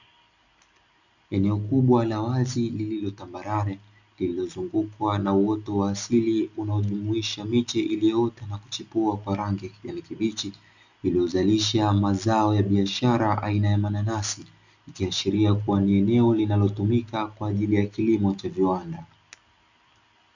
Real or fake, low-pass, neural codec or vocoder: real; 7.2 kHz; none